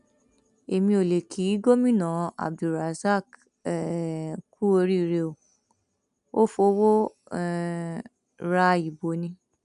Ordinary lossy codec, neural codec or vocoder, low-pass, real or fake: none; none; 10.8 kHz; real